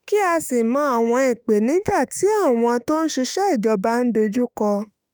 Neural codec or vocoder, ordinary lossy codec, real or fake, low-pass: autoencoder, 48 kHz, 32 numbers a frame, DAC-VAE, trained on Japanese speech; none; fake; none